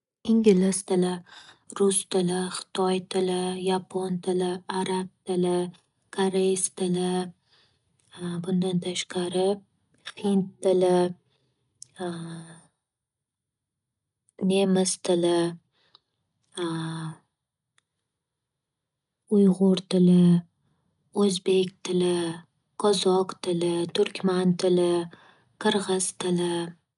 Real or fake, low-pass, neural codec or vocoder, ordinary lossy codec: real; 10.8 kHz; none; none